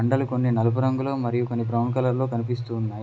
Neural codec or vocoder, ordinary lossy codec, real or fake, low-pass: none; none; real; none